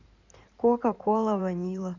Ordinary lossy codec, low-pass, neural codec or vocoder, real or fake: none; 7.2 kHz; codec, 16 kHz in and 24 kHz out, 2.2 kbps, FireRedTTS-2 codec; fake